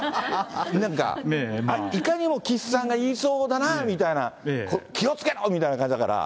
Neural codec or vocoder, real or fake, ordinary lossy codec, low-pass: none; real; none; none